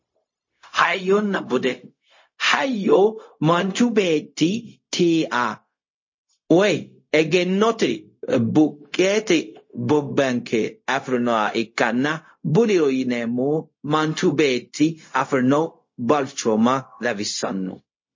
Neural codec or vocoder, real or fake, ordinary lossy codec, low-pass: codec, 16 kHz, 0.4 kbps, LongCat-Audio-Codec; fake; MP3, 32 kbps; 7.2 kHz